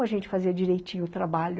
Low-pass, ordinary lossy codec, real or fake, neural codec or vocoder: none; none; real; none